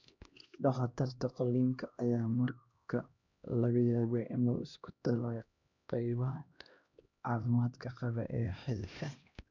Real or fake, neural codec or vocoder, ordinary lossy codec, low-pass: fake; codec, 16 kHz, 1 kbps, X-Codec, HuBERT features, trained on LibriSpeech; none; 7.2 kHz